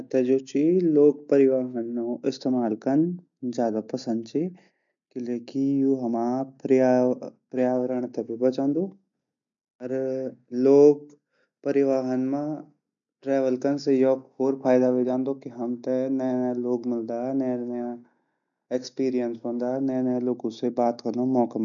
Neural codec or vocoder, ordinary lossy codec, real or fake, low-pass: none; none; real; 7.2 kHz